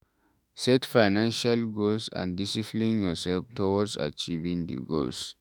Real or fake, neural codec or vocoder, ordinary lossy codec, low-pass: fake; autoencoder, 48 kHz, 32 numbers a frame, DAC-VAE, trained on Japanese speech; none; none